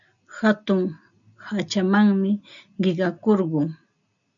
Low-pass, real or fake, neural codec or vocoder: 7.2 kHz; real; none